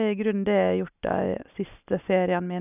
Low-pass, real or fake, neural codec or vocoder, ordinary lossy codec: 3.6 kHz; real; none; none